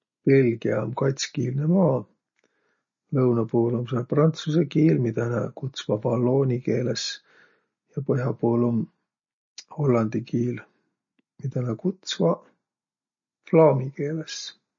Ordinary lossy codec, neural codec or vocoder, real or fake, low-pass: MP3, 32 kbps; none; real; 7.2 kHz